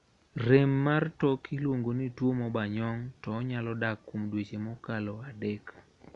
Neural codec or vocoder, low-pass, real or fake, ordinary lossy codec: none; 10.8 kHz; real; none